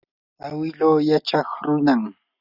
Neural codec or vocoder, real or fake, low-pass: none; real; 5.4 kHz